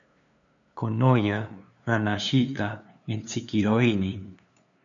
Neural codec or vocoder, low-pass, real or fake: codec, 16 kHz, 2 kbps, FunCodec, trained on LibriTTS, 25 frames a second; 7.2 kHz; fake